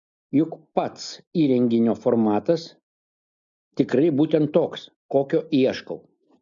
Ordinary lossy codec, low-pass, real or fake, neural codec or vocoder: MP3, 64 kbps; 7.2 kHz; real; none